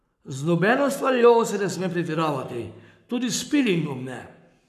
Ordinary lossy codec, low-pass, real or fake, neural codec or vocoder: none; 14.4 kHz; fake; codec, 44.1 kHz, 7.8 kbps, Pupu-Codec